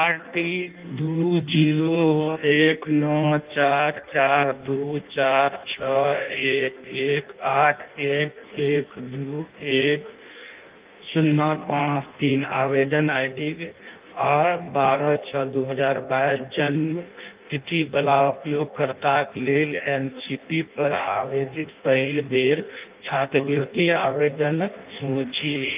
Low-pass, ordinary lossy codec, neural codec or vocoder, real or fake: 3.6 kHz; Opus, 24 kbps; codec, 16 kHz in and 24 kHz out, 0.6 kbps, FireRedTTS-2 codec; fake